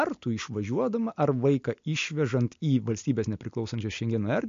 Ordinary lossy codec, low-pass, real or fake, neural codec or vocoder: MP3, 48 kbps; 7.2 kHz; real; none